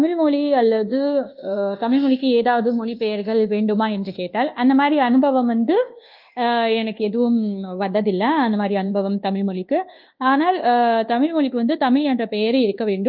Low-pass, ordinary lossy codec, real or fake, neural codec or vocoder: 5.4 kHz; Opus, 32 kbps; fake; codec, 24 kHz, 1.2 kbps, DualCodec